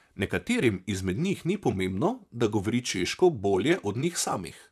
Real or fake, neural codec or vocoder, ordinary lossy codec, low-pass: fake; vocoder, 44.1 kHz, 128 mel bands, Pupu-Vocoder; none; 14.4 kHz